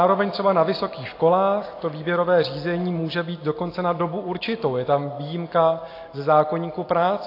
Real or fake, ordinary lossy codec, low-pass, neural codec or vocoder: real; AAC, 32 kbps; 5.4 kHz; none